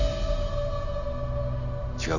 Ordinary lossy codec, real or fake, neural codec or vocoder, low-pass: none; real; none; 7.2 kHz